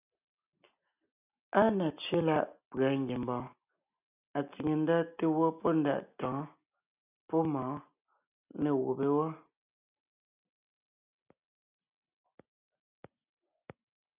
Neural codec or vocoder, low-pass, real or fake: none; 3.6 kHz; real